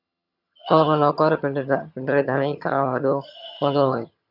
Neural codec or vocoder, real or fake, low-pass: vocoder, 22.05 kHz, 80 mel bands, HiFi-GAN; fake; 5.4 kHz